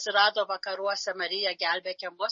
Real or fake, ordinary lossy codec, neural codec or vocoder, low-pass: real; MP3, 32 kbps; none; 7.2 kHz